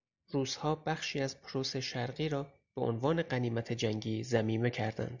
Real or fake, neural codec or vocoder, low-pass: real; none; 7.2 kHz